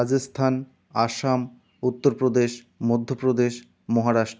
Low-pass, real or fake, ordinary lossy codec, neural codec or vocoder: none; real; none; none